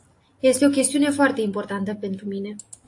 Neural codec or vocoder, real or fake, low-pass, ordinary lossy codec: none; real; 10.8 kHz; AAC, 48 kbps